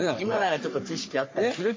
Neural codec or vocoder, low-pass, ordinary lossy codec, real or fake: codec, 44.1 kHz, 3.4 kbps, Pupu-Codec; 7.2 kHz; MP3, 32 kbps; fake